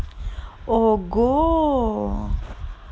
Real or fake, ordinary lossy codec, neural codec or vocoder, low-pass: real; none; none; none